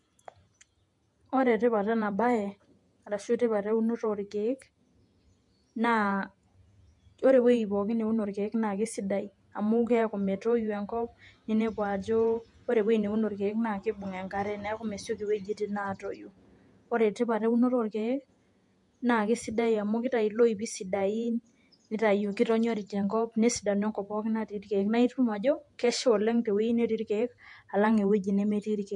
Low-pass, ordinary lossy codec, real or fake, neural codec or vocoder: 10.8 kHz; MP3, 64 kbps; fake; vocoder, 48 kHz, 128 mel bands, Vocos